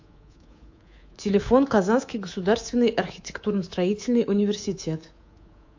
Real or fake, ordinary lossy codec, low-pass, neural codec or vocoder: fake; AAC, 48 kbps; 7.2 kHz; codec, 24 kHz, 3.1 kbps, DualCodec